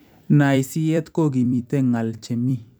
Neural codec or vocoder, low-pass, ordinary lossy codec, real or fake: vocoder, 44.1 kHz, 128 mel bands every 256 samples, BigVGAN v2; none; none; fake